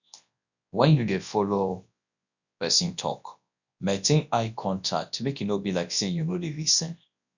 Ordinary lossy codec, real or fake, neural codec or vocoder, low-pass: none; fake; codec, 24 kHz, 0.9 kbps, WavTokenizer, large speech release; 7.2 kHz